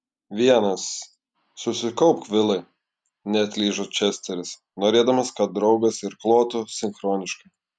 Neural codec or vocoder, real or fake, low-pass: none; real; 9.9 kHz